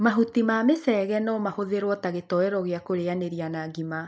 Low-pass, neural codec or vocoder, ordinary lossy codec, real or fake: none; none; none; real